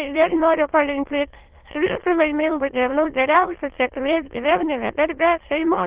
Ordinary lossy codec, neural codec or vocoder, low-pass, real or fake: Opus, 16 kbps; autoencoder, 22.05 kHz, a latent of 192 numbers a frame, VITS, trained on many speakers; 3.6 kHz; fake